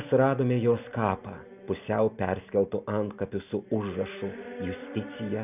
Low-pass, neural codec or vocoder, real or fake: 3.6 kHz; none; real